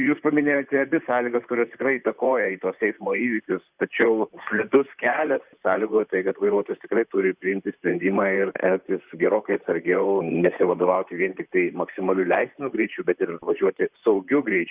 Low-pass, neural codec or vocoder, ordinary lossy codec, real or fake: 3.6 kHz; vocoder, 44.1 kHz, 128 mel bands, Pupu-Vocoder; Opus, 64 kbps; fake